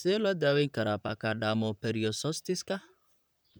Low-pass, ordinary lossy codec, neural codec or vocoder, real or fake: none; none; vocoder, 44.1 kHz, 128 mel bands, Pupu-Vocoder; fake